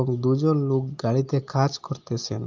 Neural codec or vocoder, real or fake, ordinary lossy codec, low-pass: none; real; Opus, 32 kbps; 7.2 kHz